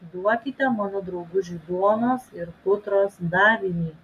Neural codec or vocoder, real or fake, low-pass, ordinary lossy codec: none; real; 14.4 kHz; MP3, 64 kbps